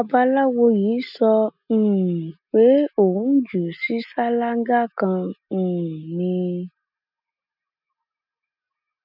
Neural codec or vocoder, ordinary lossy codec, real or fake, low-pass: none; none; real; 5.4 kHz